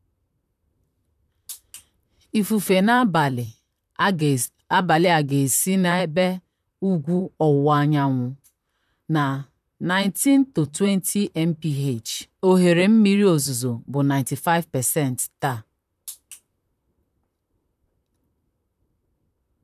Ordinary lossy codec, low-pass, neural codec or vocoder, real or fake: none; 14.4 kHz; vocoder, 44.1 kHz, 128 mel bands, Pupu-Vocoder; fake